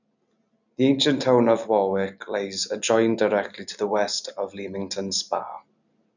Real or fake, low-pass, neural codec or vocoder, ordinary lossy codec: real; 7.2 kHz; none; none